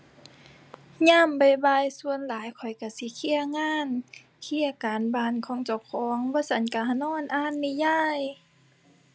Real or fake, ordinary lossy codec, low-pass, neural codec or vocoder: real; none; none; none